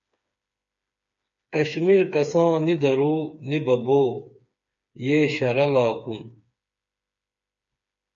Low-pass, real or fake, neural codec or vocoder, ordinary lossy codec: 7.2 kHz; fake; codec, 16 kHz, 4 kbps, FreqCodec, smaller model; MP3, 48 kbps